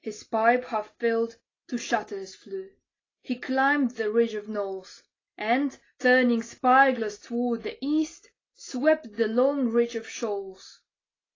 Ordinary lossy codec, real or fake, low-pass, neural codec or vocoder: AAC, 32 kbps; real; 7.2 kHz; none